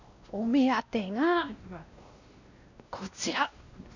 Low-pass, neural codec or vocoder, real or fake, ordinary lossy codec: 7.2 kHz; codec, 16 kHz, 1 kbps, X-Codec, WavLM features, trained on Multilingual LibriSpeech; fake; none